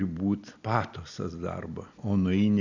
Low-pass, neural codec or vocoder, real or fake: 7.2 kHz; none; real